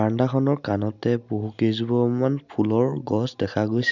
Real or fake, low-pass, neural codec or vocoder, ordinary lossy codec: real; 7.2 kHz; none; none